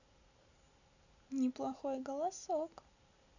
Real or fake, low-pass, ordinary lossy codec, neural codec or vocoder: real; 7.2 kHz; none; none